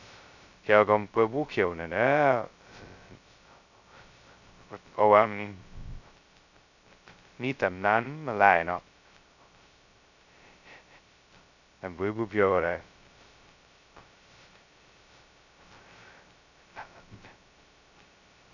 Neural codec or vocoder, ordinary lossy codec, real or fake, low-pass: codec, 16 kHz, 0.2 kbps, FocalCodec; none; fake; 7.2 kHz